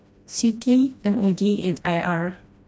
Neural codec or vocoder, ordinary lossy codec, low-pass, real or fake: codec, 16 kHz, 1 kbps, FreqCodec, smaller model; none; none; fake